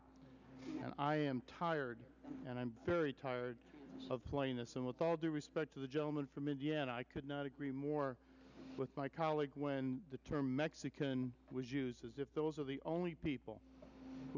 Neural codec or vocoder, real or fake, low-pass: none; real; 7.2 kHz